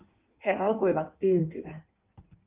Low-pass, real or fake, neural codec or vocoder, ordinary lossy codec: 3.6 kHz; fake; codec, 16 kHz in and 24 kHz out, 1.1 kbps, FireRedTTS-2 codec; Opus, 24 kbps